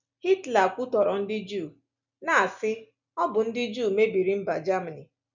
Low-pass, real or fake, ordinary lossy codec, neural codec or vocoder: 7.2 kHz; real; none; none